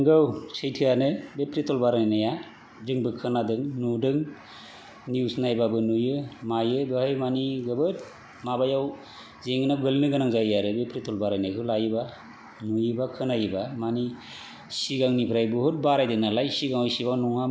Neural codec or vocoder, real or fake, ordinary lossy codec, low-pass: none; real; none; none